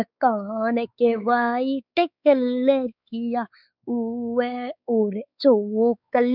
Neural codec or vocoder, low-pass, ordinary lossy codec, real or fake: codec, 16 kHz, 4 kbps, X-Codec, WavLM features, trained on Multilingual LibriSpeech; 5.4 kHz; none; fake